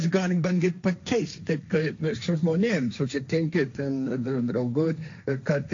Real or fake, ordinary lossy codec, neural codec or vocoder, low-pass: fake; AAC, 48 kbps; codec, 16 kHz, 1.1 kbps, Voila-Tokenizer; 7.2 kHz